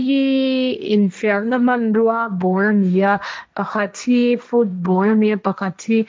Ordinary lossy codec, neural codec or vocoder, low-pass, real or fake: none; codec, 16 kHz, 1.1 kbps, Voila-Tokenizer; none; fake